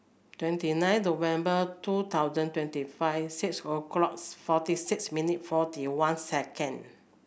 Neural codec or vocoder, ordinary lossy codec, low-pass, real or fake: none; none; none; real